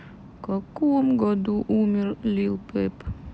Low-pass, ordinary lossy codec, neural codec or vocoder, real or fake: none; none; none; real